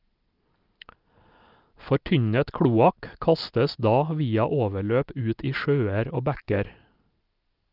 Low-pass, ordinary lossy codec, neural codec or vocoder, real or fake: 5.4 kHz; Opus, 24 kbps; none; real